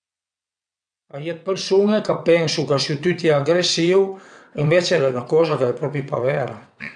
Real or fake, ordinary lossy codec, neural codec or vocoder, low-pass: fake; none; vocoder, 22.05 kHz, 80 mel bands, WaveNeXt; 9.9 kHz